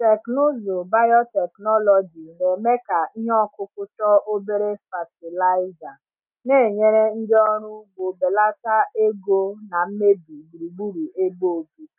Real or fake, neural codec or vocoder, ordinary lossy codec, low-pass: real; none; none; 3.6 kHz